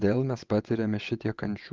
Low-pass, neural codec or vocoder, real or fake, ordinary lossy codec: 7.2 kHz; vocoder, 22.05 kHz, 80 mel bands, WaveNeXt; fake; Opus, 32 kbps